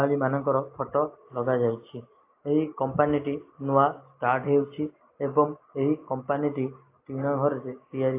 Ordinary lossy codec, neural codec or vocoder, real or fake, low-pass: AAC, 24 kbps; none; real; 3.6 kHz